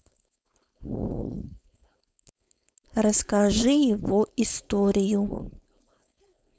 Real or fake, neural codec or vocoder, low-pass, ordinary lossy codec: fake; codec, 16 kHz, 4.8 kbps, FACodec; none; none